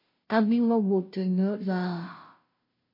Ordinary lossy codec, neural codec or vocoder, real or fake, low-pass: MP3, 32 kbps; codec, 16 kHz, 0.5 kbps, FunCodec, trained on Chinese and English, 25 frames a second; fake; 5.4 kHz